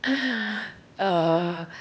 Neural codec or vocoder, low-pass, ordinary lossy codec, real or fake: codec, 16 kHz, 0.8 kbps, ZipCodec; none; none; fake